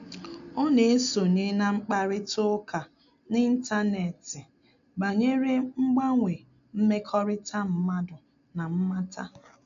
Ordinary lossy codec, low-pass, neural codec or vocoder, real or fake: none; 7.2 kHz; none; real